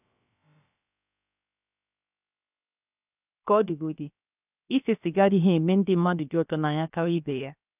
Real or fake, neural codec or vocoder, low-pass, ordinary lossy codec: fake; codec, 16 kHz, 0.7 kbps, FocalCodec; 3.6 kHz; none